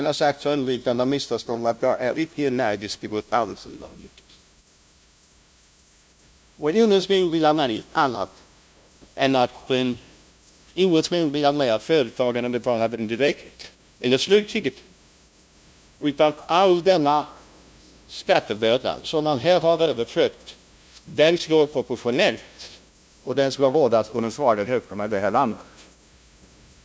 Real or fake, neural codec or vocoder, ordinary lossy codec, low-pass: fake; codec, 16 kHz, 0.5 kbps, FunCodec, trained on LibriTTS, 25 frames a second; none; none